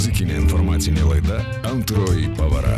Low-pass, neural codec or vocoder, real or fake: 14.4 kHz; none; real